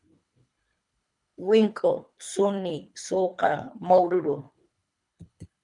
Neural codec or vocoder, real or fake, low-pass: codec, 24 kHz, 3 kbps, HILCodec; fake; 10.8 kHz